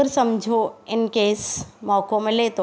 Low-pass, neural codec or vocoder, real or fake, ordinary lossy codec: none; none; real; none